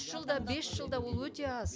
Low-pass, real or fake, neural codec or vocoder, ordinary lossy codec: none; real; none; none